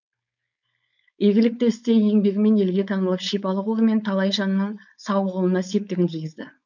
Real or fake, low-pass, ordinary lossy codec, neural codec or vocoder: fake; 7.2 kHz; none; codec, 16 kHz, 4.8 kbps, FACodec